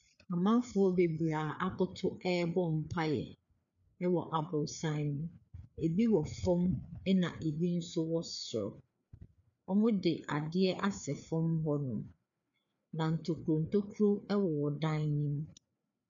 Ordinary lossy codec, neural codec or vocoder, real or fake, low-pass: MP3, 96 kbps; codec, 16 kHz, 4 kbps, FreqCodec, larger model; fake; 7.2 kHz